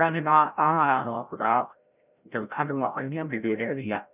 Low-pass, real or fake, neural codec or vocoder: 3.6 kHz; fake; codec, 16 kHz, 0.5 kbps, FreqCodec, larger model